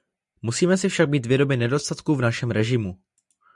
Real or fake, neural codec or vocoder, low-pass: real; none; 10.8 kHz